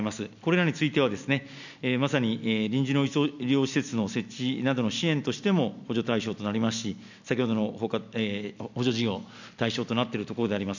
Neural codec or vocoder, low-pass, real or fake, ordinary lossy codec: none; 7.2 kHz; real; none